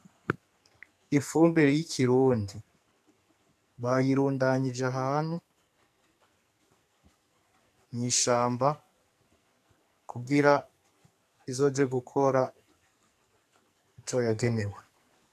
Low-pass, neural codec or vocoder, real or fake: 14.4 kHz; codec, 44.1 kHz, 2.6 kbps, SNAC; fake